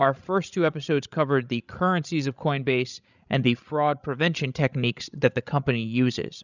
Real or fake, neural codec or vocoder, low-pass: fake; codec, 16 kHz, 16 kbps, FreqCodec, larger model; 7.2 kHz